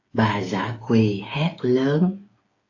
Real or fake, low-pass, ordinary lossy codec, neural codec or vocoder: fake; 7.2 kHz; AAC, 32 kbps; codec, 16 kHz, 8 kbps, FreqCodec, smaller model